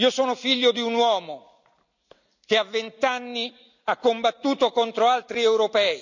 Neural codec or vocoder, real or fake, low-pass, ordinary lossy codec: none; real; 7.2 kHz; none